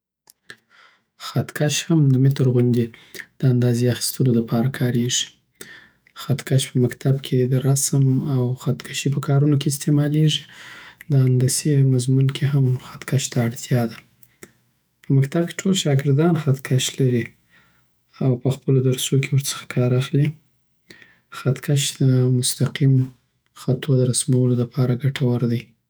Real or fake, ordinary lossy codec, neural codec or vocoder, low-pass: fake; none; autoencoder, 48 kHz, 128 numbers a frame, DAC-VAE, trained on Japanese speech; none